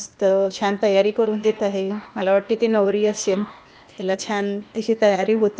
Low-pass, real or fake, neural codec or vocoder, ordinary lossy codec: none; fake; codec, 16 kHz, 0.8 kbps, ZipCodec; none